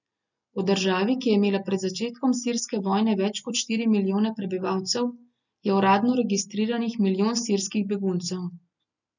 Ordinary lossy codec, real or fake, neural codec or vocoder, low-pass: none; real; none; 7.2 kHz